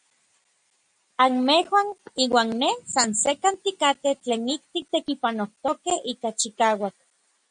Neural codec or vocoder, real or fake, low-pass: none; real; 9.9 kHz